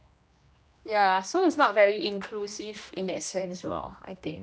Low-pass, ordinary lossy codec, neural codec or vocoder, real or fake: none; none; codec, 16 kHz, 1 kbps, X-Codec, HuBERT features, trained on general audio; fake